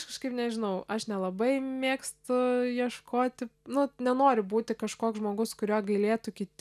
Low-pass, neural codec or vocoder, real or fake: 14.4 kHz; none; real